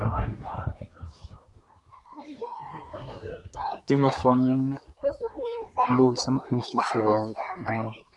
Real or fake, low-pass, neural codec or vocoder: fake; 10.8 kHz; codec, 24 kHz, 1 kbps, SNAC